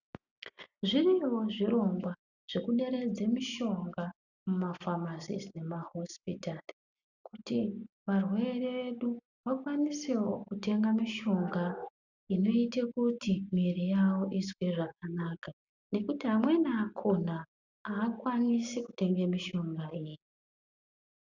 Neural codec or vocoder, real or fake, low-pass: none; real; 7.2 kHz